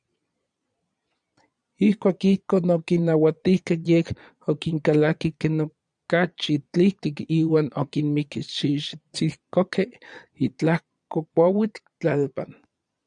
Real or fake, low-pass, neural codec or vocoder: fake; 9.9 kHz; vocoder, 22.05 kHz, 80 mel bands, Vocos